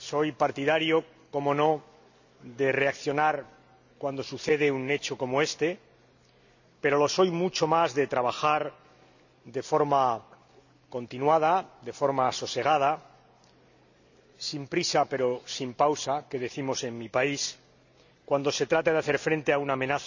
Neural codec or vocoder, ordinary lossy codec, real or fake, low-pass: none; none; real; 7.2 kHz